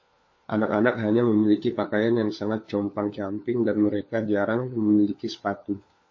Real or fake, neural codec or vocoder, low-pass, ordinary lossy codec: fake; codec, 16 kHz, 4 kbps, FunCodec, trained on LibriTTS, 50 frames a second; 7.2 kHz; MP3, 32 kbps